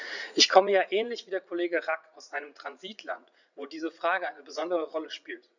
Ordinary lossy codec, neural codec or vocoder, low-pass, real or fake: none; vocoder, 44.1 kHz, 80 mel bands, Vocos; 7.2 kHz; fake